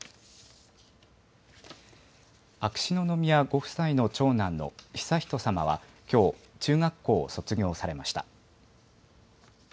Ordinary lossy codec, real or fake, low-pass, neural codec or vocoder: none; real; none; none